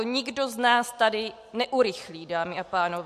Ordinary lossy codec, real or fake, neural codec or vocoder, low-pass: MP3, 64 kbps; real; none; 14.4 kHz